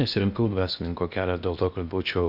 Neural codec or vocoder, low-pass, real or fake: codec, 16 kHz in and 24 kHz out, 0.8 kbps, FocalCodec, streaming, 65536 codes; 5.4 kHz; fake